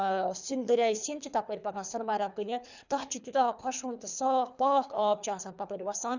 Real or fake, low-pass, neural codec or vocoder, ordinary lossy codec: fake; 7.2 kHz; codec, 24 kHz, 3 kbps, HILCodec; none